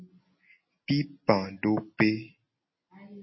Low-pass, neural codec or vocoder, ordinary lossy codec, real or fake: 7.2 kHz; none; MP3, 24 kbps; real